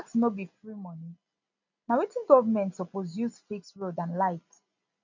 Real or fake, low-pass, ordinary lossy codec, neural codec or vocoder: real; 7.2 kHz; none; none